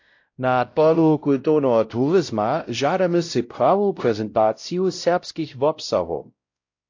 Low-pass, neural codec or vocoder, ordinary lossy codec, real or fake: 7.2 kHz; codec, 16 kHz, 0.5 kbps, X-Codec, WavLM features, trained on Multilingual LibriSpeech; AAC, 48 kbps; fake